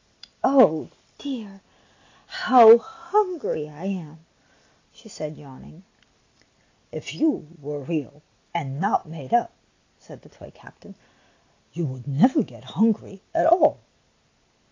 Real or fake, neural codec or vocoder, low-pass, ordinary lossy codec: real; none; 7.2 kHz; AAC, 32 kbps